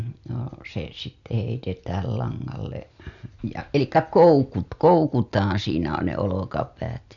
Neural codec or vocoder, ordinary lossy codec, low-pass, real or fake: none; none; 7.2 kHz; real